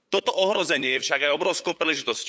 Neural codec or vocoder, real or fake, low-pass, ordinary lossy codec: codec, 16 kHz, 8 kbps, FunCodec, trained on LibriTTS, 25 frames a second; fake; none; none